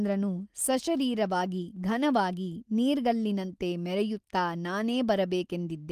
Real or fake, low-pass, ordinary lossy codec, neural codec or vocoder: real; 14.4 kHz; Opus, 24 kbps; none